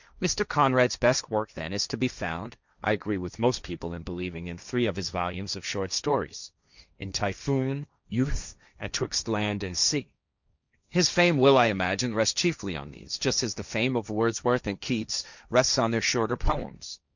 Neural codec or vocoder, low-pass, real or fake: codec, 16 kHz, 1.1 kbps, Voila-Tokenizer; 7.2 kHz; fake